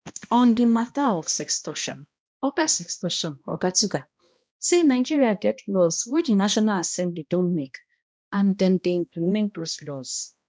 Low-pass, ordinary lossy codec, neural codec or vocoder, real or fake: none; none; codec, 16 kHz, 1 kbps, X-Codec, HuBERT features, trained on balanced general audio; fake